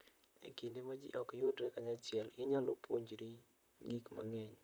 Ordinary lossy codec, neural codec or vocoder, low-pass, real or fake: none; vocoder, 44.1 kHz, 128 mel bands, Pupu-Vocoder; none; fake